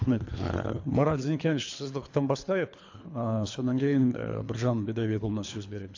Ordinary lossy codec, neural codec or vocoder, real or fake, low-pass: AAC, 48 kbps; codec, 24 kHz, 3 kbps, HILCodec; fake; 7.2 kHz